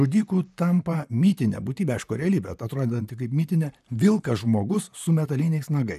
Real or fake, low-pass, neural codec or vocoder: fake; 14.4 kHz; vocoder, 44.1 kHz, 128 mel bands, Pupu-Vocoder